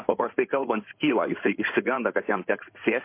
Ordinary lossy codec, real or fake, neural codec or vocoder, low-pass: MP3, 24 kbps; fake; codec, 16 kHz in and 24 kHz out, 2.2 kbps, FireRedTTS-2 codec; 3.6 kHz